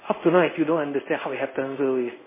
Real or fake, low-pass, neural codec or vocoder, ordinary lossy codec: fake; 3.6 kHz; codec, 16 kHz in and 24 kHz out, 1 kbps, XY-Tokenizer; MP3, 16 kbps